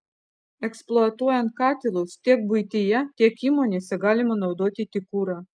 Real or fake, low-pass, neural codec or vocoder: real; 9.9 kHz; none